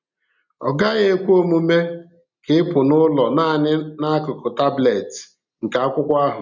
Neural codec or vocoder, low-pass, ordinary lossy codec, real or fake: none; 7.2 kHz; none; real